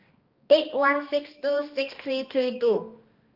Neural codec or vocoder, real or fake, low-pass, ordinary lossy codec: codec, 16 kHz, 1 kbps, X-Codec, HuBERT features, trained on balanced general audio; fake; 5.4 kHz; Opus, 24 kbps